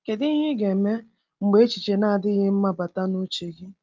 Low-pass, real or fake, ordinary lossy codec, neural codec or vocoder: 7.2 kHz; real; Opus, 24 kbps; none